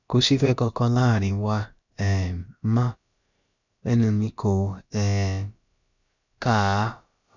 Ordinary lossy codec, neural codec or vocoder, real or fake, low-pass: none; codec, 16 kHz, about 1 kbps, DyCAST, with the encoder's durations; fake; 7.2 kHz